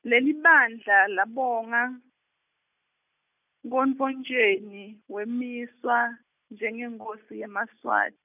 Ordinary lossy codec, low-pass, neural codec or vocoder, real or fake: none; 3.6 kHz; none; real